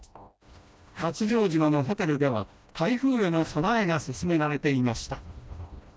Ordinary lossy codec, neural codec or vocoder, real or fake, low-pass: none; codec, 16 kHz, 1 kbps, FreqCodec, smaller model; fake; none